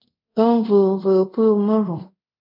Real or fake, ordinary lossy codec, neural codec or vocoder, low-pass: fake; MP3, 48 kbps; codec, 24 kHz, 0.5 kbps, DualCodec; 5.4 kHz